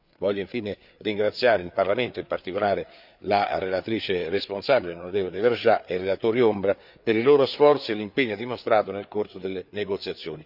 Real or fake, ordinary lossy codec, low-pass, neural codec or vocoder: fake; none; 5.4 kHz; codec, 16 kHz, 4 kbps, FreqCodec, larger model